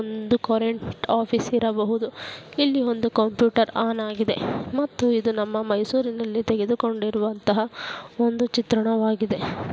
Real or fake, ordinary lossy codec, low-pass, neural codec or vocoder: real; none; none; none